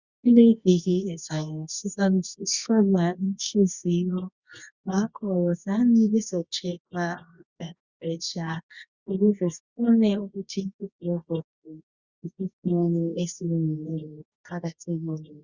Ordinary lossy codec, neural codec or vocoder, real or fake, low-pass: Opus, 64 kbps; codec, 24 kHz, 0.9 kbps, WavTokenizer, medium music audio release; fake; 7.2 kHz